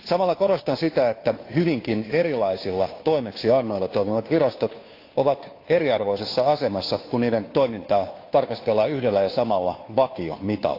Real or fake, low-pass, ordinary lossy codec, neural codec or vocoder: fake; 5.4 kHz; AAC, 32 kbps; codec, 16 kHz, 2 kbps, FunCodec, trained on Chinese and English, 25 frames a second